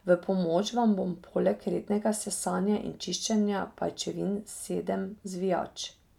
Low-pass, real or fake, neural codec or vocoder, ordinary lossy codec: 19.8 kHz; real; none; none